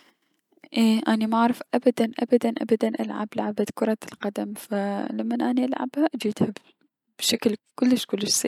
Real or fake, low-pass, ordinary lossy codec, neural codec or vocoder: real; 19.8 kHz; none; none